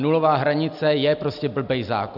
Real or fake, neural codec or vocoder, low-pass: real; none; 5.4 kHz